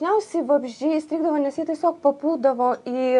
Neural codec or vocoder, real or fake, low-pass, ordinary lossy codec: none; real; 10.8 kHz; AAC, 64 kbps